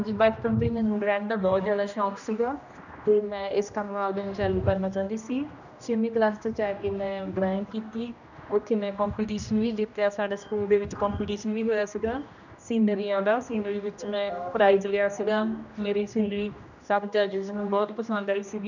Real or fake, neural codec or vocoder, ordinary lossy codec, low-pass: fake; codec, 16 kHz, 1 kbps, X-Codec, HuBERT features, trained on general audio; none; 7.2 kHz